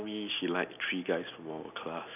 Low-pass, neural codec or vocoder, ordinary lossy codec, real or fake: 3.6 kHz; none; none; real